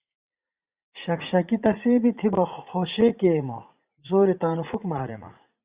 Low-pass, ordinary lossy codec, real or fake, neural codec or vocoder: 3.6 kHz; Opus, 64 kbps; fake; vocoder, 44.1 kHz, 80 mel bands, Vocos